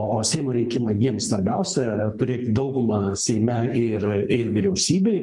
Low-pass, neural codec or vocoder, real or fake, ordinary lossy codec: 10.8 kHz; codec, 24 kHz, 3 kbps, HILCodec; fake; MP3, 64 kbps